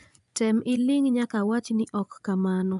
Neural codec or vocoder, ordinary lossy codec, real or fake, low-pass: vocoder, 24 kHz, 100 mel bands, Vocos; none; fake; 10.8 kHz